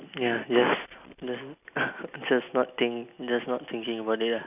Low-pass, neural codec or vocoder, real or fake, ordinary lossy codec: 3.6 kHz; none; real; none